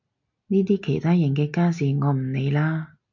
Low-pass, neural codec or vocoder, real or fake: 7.2 kHz; none; real